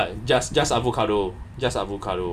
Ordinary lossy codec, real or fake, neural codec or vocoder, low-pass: none; real; none; 14.4 kHz